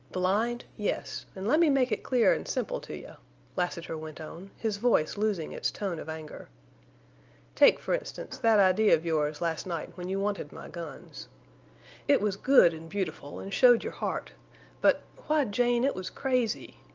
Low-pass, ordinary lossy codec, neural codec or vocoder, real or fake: 7.2 kHz; Opus, 24 kbps; none; real